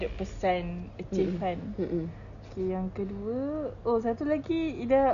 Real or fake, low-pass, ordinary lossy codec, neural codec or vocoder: real; 7.2 kHz; none; none